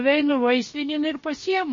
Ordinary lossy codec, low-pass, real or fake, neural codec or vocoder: MP3, 32 kbps; 7.2 kHz; fake; codec, 16 kHz, about 1 kbps, DyCAST, with the encoder's durations